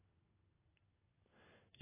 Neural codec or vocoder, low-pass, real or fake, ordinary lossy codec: none; 3.6 kHz; real; AAC, 16 kbps